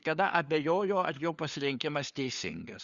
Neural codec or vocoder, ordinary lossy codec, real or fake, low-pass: codec, 16 kHz, 4 kbps, FunCodec, trained on LibriTTS, 50 frames a second; Opus, 64 kbps; fake; 7.2 kHz